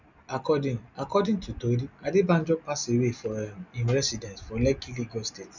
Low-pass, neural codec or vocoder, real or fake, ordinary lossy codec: 7.2 kHz; none; real; none